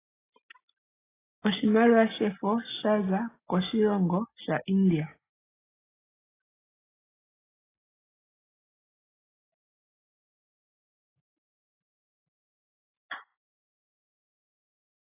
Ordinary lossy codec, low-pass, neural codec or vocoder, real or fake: AAC, 16 kbps; 3.6 kHz; none; real